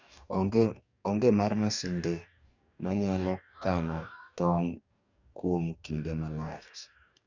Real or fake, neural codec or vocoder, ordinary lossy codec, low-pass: fake; codec, 44.1 kHz, 2.6 kbps, DAC; none; 7.2 kHz